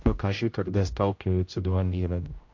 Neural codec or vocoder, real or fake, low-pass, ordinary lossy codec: codec, 16 kHz, 0.5 kbps, X-Codec, HuBERT features, trained on general audio; fake; 7.2 kHz; MP3, 48 kbps